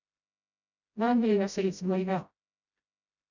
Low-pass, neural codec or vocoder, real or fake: 7.2 kHz; codec, 16 kHz, 0.5 kbps, FreqCodec, smaller model; fake